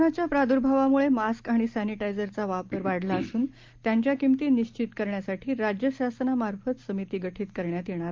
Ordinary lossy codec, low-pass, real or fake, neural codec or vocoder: Opus, 32 kbps; 7.2 kHz; real; none